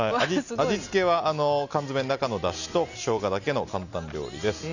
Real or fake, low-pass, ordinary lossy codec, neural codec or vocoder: real; 7.2 kHz; none; none